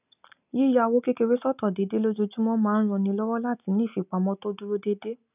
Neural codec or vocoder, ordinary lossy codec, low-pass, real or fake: none; none; 3.6 kHz; real